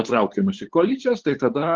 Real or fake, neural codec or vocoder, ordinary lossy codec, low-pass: fake; codec, 16 kHz, 8 kbps, FunCodec, trained on LibriTTS, 25 frames a second; Opus, 16 kbps; 7.2 kHz